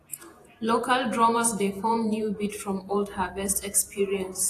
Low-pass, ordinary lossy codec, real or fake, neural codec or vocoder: 14.4 kHz; AAC, 48 kbps; fake; vocoder, 48 kHz, 128 mel bands, Vocos